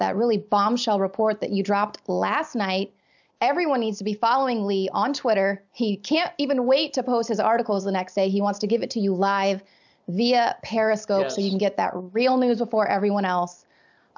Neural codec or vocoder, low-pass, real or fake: none; 7.2 kHz; real